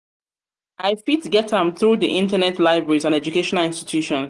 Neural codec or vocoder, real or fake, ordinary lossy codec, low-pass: none; real; Opus, 24 kbps; 10.8 kHz